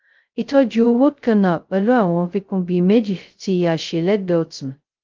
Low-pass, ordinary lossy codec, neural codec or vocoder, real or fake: 7.2 kHz; Opus, 32 kbps; codec, 16 kHz, 0.2 kbps, FocalCodec; fake